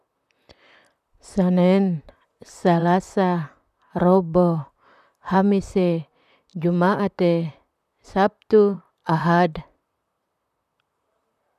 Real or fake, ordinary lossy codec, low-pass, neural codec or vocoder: fake; none; 14.4 kHz; vocoder, 44.1 kHz, 128 mel bands, Pupu-Vocoder